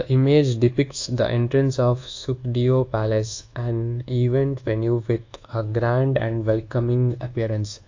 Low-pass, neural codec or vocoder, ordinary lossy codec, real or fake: 7.2 kHz; codec, 24 kHz, 1.2 kbps, DualCodec; AAC, 48 kbps; fake